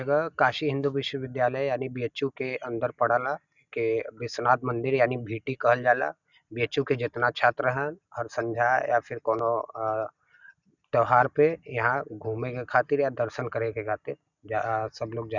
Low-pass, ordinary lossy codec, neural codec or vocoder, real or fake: 7.2 kHz; none; none; real